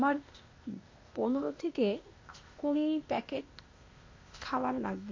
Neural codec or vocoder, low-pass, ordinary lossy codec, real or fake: codec, 16 kHz, 0.8 kbps, ZipCodec; 7.2 kHz; MP3, 48 kbps; fake